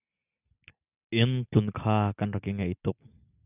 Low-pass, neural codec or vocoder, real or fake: 3.6 kHz; none; real